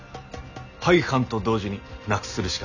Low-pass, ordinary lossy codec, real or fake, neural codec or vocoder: 7.2 kHz; none; real; none